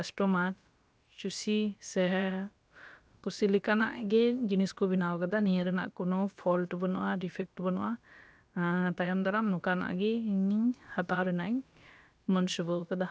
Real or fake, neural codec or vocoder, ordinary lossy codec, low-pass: fake; codec, 16 kHz, about 1 kbps, DyCAST, with the encoder's durations; none; none